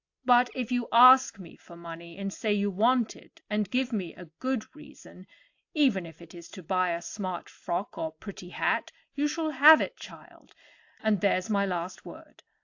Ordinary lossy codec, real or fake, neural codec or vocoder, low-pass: AAC, 48 kbps; real; none; 7.2 kHz